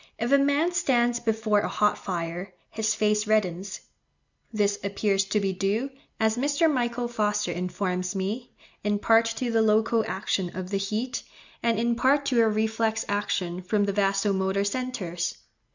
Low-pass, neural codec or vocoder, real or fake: 7.2 kHz; none; real